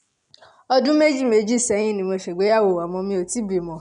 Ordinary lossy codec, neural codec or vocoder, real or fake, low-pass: none; none; real; 10.8 kHz